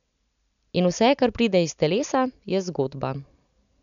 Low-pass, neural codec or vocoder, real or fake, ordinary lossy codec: 7.2 kHz; none; real; none